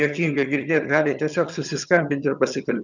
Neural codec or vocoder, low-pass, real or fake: vocoder, 22.05 kHz, 80 mel bands, HiFi-GAN; 7.2 kHz; fake